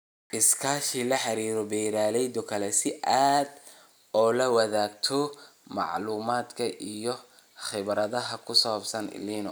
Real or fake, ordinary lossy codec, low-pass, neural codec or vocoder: real; none; none; none